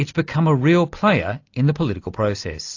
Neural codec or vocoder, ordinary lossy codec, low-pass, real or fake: none; AAC, 48 kbps; 7.2 kHz; real